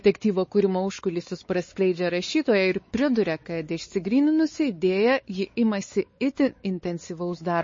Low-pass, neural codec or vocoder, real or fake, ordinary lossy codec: 7.2 kHz; codec, 16 kHz, 4 kbps, X-Codec, WavLM features, trained on Multilingual LibriSpeech; fake; MP3, 32 kbps